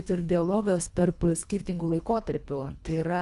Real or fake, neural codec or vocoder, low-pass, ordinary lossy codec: fake; codec, 24 kHz, 1.5 kbps, HILCodec; 10.8 kHz; Opus, 64 kbps